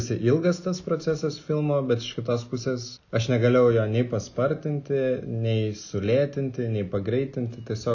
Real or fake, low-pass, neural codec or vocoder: real; 7.2 kHz; none